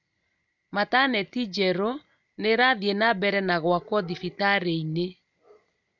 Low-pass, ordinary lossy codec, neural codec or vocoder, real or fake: 7.2 kHz; none; none; real